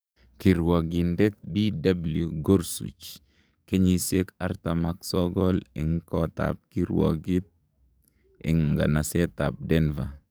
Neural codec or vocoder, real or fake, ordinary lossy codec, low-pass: codec, 44.1 kHz, 7.8 kbps, DAC; fake; none; none